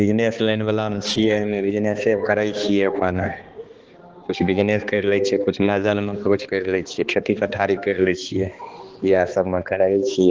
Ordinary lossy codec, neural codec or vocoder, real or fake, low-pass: Opus, 16 kbps; codec, 16 kHz, 2 kbps, X-Codec, HuBERT features, trained on balanced general audio; fake; 7.2 kHz